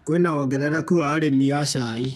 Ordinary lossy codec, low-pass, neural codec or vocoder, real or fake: none; 14.4 kHz; codec, 32 kHz, 1.9 kbps, SNAC; fake